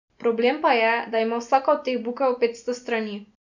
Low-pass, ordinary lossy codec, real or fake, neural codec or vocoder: 7.2 kHz; none; real; none